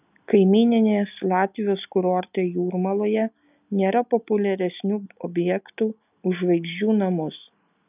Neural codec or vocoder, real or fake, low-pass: none; real; 3.6 kHz